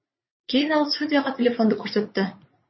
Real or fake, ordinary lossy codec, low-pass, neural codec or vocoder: fake; MP3, 24 kbps; 7.2 kHz; vocoder, 44.1 kHz, 80 mel bands, Vocos